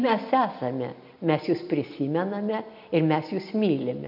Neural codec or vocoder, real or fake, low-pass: none; real; 5.4 kHz